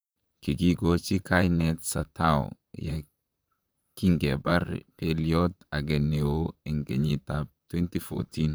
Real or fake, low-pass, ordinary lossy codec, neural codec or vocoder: fake; none; none; vocoder, 44.1 kHz, 128 mel bands, Pupu-Vocoder